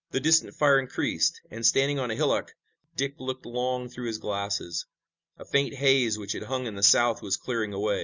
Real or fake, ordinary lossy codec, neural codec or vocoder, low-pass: real; Opus, 64 kbps; none; 7.2 kHz